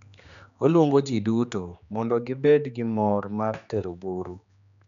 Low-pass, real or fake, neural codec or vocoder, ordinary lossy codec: 7.2 kHz; fake; codec, 16 kHz, 2 kbps, X-Codec, HuBERT features, trained on general audio; none